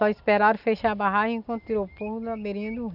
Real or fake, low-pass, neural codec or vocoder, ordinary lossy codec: real; 5.4 kHz; none; none